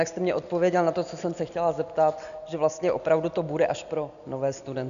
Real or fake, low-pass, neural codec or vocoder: real; 7.2 kHz; none